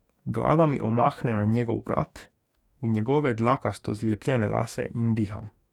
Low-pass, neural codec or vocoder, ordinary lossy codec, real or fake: 19.8 kHz; codec, 44.1 kHz, 2.6 kbps, DAC; none; fake